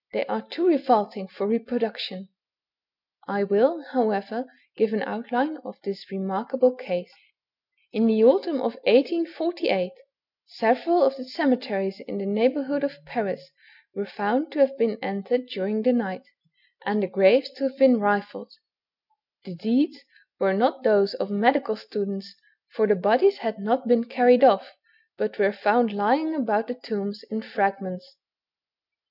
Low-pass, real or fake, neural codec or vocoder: 5.4 kHz; real; none